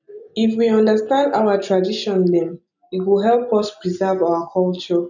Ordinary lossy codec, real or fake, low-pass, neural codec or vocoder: none; real; 7.2 kHz; none